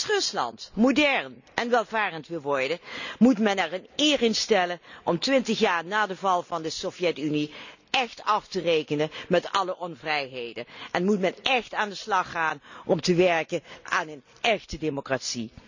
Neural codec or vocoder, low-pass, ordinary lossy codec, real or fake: none; 7.2 kHz; none; real